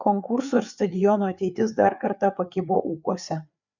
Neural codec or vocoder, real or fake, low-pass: codec, 16 kHz, 4 kbps, FreqCodec, larger model; fake; 7.2 kHz